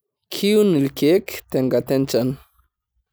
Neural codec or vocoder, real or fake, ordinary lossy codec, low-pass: none; real; none; none